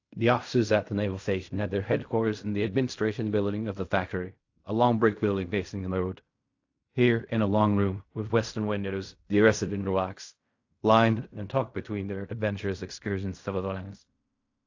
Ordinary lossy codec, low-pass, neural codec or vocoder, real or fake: AAC, 48 kbps; 7.2 kHz; codec, 16 kHz in and 24 kHz out, 0.4 kbps, LongCat-Audio-Codec, fine tuned four codebook decoder; fake